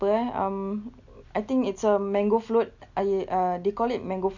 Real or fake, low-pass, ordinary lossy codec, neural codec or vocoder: real; 7.2 kHz; none; none